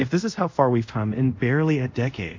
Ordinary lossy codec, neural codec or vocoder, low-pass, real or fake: MP3, 48 kbps; codec, 24 kHz, 0.5 kbps, DualCodec; 7.2 kHz; fake